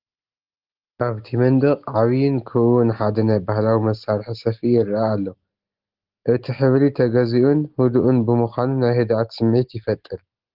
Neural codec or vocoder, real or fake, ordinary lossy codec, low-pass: none; real; Opus, 16 kbps; 5.4 kHz